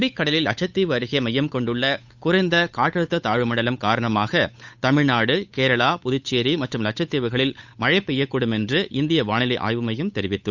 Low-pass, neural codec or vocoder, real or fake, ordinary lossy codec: 7.2 kHz; codec, 16 kHz, 8 kbps, FunCodec, trained on Chinese and English, 25 frames a second; fake; none